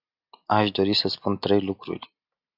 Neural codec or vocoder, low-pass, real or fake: none; 5.4 kHz; real